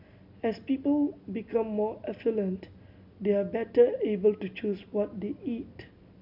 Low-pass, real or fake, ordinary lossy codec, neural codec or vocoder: 5.4 kHz; real; none; none